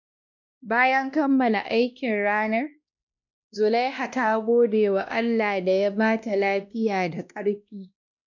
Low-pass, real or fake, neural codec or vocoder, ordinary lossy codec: 7.2 kHz; fake; codec, 16 kHz, 1 kbps, X-Codec, WavLM features, trained on Multilingual LibriSpeech; none